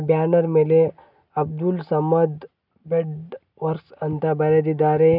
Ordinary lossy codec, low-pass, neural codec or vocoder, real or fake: none; 5.4 kHz; none; real